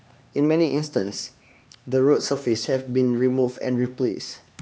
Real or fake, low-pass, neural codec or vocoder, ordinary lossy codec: fake; none; codec, 16 kHz, 2 kbps, X-Codec, HuBERT features, trained on LibriSpeech; none